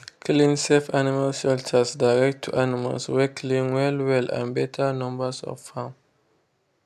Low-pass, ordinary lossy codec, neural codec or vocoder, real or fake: 14.4 kHz; AAC, 96 kbps; none; real